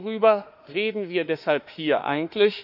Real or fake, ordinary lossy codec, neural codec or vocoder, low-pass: fake; none; autoencoder, 48 kHz, 32 numbers a frame, DAC-VAE, trained on Japanese speech; 5.4 kHz